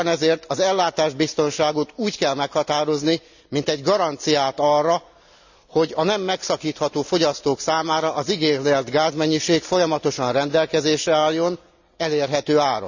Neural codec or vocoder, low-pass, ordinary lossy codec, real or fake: none; 7.2 kHz; none; real